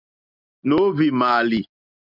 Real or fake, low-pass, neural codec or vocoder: real; 5.4 kHz; none